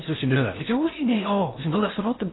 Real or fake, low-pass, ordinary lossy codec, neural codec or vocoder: fake; 7.2 kHz; AAC, 16 kbps; codec, 16 kHz in and 24 kHz out, 0.6 kbps, FocalCodec, streaming, 2048 codes